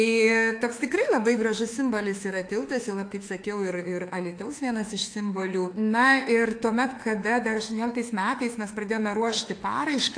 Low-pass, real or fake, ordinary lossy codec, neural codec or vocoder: 9.9 kHz; fake; AAC, 48 kbps; autoencoder, 48 kHz, 32 numbers a frame, DAC-VAE, trained on Japanese speech